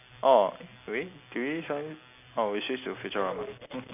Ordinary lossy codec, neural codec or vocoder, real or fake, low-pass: none; none; real; 3.6 kHz